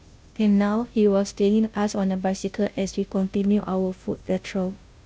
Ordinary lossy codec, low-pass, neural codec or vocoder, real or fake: none; none; codec, 16 kHz, 0.5 kbps, FunCodec, trained on Chinese and English, 25 frames a second; fake